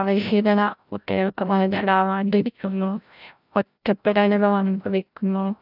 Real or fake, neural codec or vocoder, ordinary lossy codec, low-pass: fake; codec, 16 kHz, 0.5 kbps, FreqCodec, larger model; none; 5.4 kHz